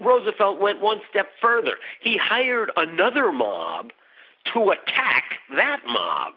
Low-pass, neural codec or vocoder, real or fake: 5.4 kHz; none; real